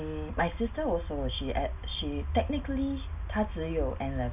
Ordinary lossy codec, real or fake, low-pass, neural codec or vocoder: none; real; 3.6 kHz; none